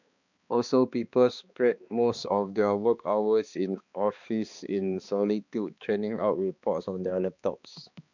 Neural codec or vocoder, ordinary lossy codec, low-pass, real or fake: codec, 16 kHz, 2 kbps, X-Codec, HuBERT features, trained on balanced general audio; none; 7.2 kHz; fake